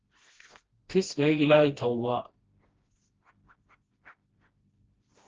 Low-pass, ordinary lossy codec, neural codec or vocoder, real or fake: 7.2 kHz; Opus, 16 kbps; codec, 16 kHz, 1 kbps, FreqCodec, smaller model; fake